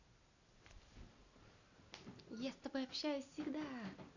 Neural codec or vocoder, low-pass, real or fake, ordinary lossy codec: none; 7.2 kHz; real; none